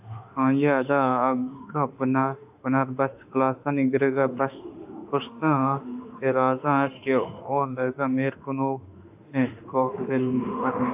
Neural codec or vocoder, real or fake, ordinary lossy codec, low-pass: autoencoder, 48 kHz, 32 numbers a frame, DAC-VAE, trained on Japanese speech; fake; AAC, 32 kbps; 3.6 kHz